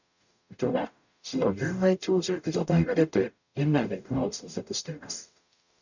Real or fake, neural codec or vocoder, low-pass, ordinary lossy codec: fake; codec, 44.1 kHz, 0.9 kbps, DAC; 7.2 kHz; none